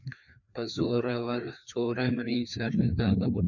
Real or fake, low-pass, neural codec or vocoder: fake; 7.2 kHz; codec, 16 kHz, 2 kbps, FreqCodec, larger model